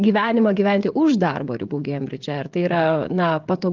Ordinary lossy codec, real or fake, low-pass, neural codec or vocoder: Opus, 32 kbps; fake; 7.2 kHz; codec, 16 kHz, 16 kbps, FreqCodec, smaller model